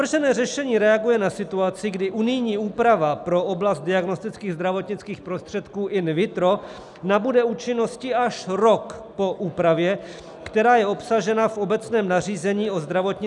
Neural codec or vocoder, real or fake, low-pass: none; real; 10.8 kHz